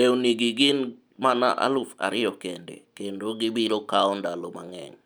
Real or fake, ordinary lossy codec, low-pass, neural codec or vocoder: real; none; none; none